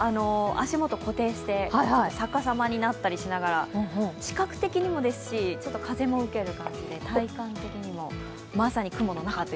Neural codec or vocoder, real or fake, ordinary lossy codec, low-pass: none; real; none; none